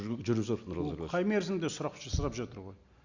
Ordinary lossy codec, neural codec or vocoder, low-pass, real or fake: Opus, 64 kbps; none; 7.2 kHz; real